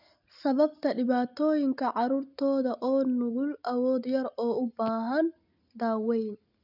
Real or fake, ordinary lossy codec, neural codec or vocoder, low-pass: real; none; none; 5.4 kHz